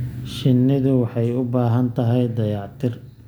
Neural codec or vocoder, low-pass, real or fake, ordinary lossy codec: none; none; real; none